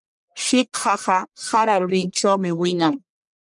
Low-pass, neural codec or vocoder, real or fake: 10.8 kHz; codec, 44.1 kHz, 1.7 kbps, Pupu-Codec; fake